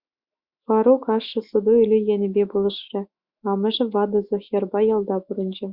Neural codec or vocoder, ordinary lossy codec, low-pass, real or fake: autoencoder, 48 kHz, 128 numbers a frame, DAC-VAE, trained on Japanese speech; Opus, 64 kbps; 5.4 kHz; fake